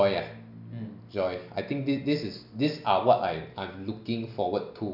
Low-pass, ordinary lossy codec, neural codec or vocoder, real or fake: 5.4 kHz; none; none; real